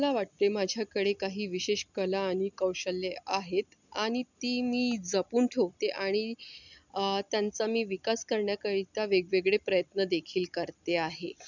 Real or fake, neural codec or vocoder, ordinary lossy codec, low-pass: real; none; none; 7.2 kHz